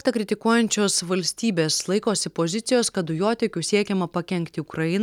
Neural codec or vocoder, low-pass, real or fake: none; 19.8 kHz; real